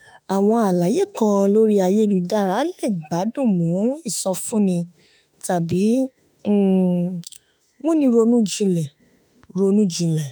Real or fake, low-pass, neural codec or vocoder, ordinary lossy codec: fake; none; autoencoder, 48 kHz, 32 numbers a frame, DAC-VAE, trained on Japanese speech; none